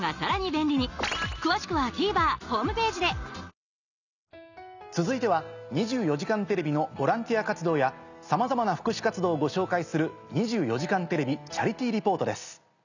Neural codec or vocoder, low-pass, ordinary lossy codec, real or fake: none; 7.2 kHz; none; real